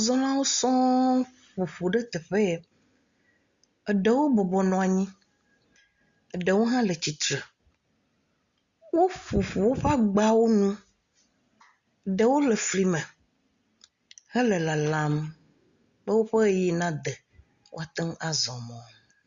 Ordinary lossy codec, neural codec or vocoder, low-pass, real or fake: Opus, 64 kbps; none; 7.2 kHz; real